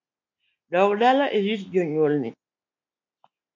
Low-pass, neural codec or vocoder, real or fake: 7.2 kHz; none; real